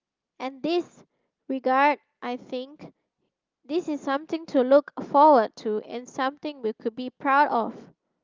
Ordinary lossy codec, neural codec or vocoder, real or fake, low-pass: Opus, 24 kbps; none; real; 7.2 kHz